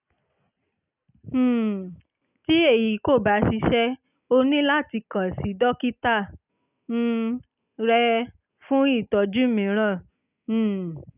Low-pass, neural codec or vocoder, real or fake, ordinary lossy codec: 3.6 kHz; none; real; none